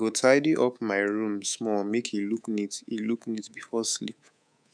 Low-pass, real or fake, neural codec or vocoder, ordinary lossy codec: 9.9 kHz; fake; codec, 24 kHz, 3.1 kbps, DualCodec; none